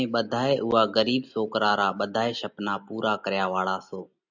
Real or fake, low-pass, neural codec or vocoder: real; 7.2 kHz; none